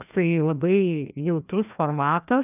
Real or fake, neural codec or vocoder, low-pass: fake; codec, 16 kHz, 1 kbps, FreqCodec, larger model; 3.6 kHz